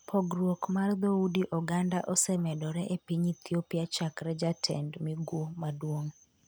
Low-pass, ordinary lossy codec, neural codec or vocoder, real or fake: none; none; none; real